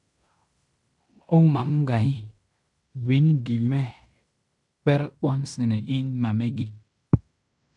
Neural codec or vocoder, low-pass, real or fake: codec, 16 kHz in and 24 kHz out, 0.9 kbps, LongCat-Audio-Codec, fine tuned four codebook decoder; 10.8 kHz; fake